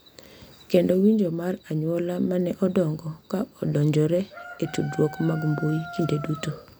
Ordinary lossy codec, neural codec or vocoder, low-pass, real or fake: none; none; none; real